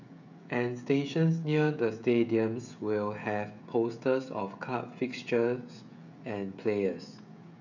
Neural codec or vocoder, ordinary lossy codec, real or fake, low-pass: codec, 16 kHz, 16 kbps, FreqCodec, smaller model; none; fake; 7.2 kHz